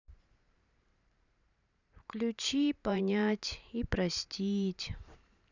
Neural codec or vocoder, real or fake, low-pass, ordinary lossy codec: vocoder, 44.1 kHz, 128 mel bands every 512 samples, BigVGAN v2; fake; 7.2 kHz; none